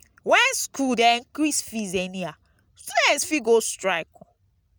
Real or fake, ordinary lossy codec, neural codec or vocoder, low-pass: real; none; none; none